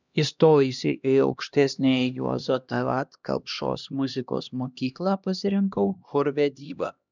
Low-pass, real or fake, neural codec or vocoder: 7.2 kHz; fake; codec, 16 kHz, 1 kbps, X-Codec, HuBERT features, trained on LibriSpeech